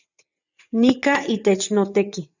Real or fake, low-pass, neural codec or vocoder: fake; 7.2 kHz; vocoder, 22.05 kHz, 80 mel bands, WaveNeXt